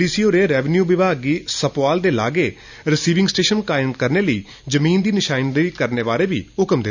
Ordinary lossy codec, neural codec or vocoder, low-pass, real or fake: none; none; 7.2 kHz; real